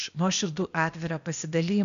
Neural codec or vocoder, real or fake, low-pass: codec, 16 kHz, 0.8 kbps, ZipCodec; fake; 7.2 kHz